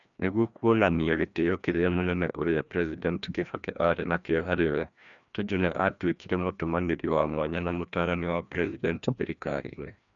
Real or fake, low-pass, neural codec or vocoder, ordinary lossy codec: fake; 7.2 kHz; codec, 16 kHz, 1 kbps, FreqCodec, larger model; none